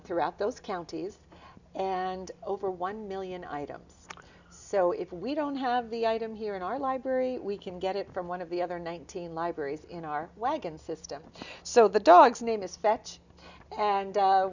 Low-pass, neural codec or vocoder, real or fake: 7.2 kHz; none; real